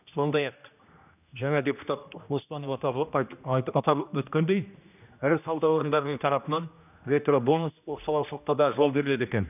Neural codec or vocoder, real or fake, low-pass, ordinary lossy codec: codec, 16 kHz, 1 kbps, X-Codec, HuBERT features, trained on general audio; fake; 3.6 kHz; none